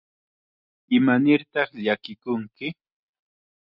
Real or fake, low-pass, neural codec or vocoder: real; 5.4 kHz; none